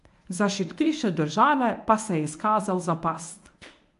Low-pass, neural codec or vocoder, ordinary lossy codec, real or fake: 10.8 kHz; codec, 24 kHz, 0.9 kbps, WavTokenizer, medium speech release version 1; AAC, 64 kbps; fake